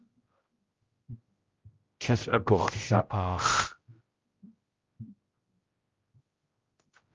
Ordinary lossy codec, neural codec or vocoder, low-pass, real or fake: Opus, 32 kbps; codec, 16 kHz, 0.5 kbps, X-Codec, HuBERT features, trained on general audio; 7.2 kHz; fake